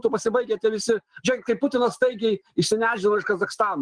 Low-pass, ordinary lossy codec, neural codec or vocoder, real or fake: 9.9 kHz; Opus, 24 kbps; none; real